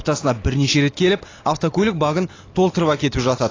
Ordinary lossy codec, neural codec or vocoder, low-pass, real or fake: AAC, 32 kbps; none; 7.2 kHz; real